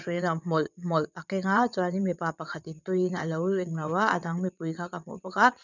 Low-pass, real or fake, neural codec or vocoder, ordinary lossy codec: 7.2 kHz; fake; vocoder, 22.05 kHz, 80 mel bands, WaveNeXt; none